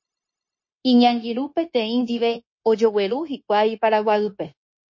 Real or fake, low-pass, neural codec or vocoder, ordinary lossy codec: fake; 7.2 kHz; codec, 16 kHz, 0.9 kbps, LongCat-Audio-Codec; MP3, 32 kbps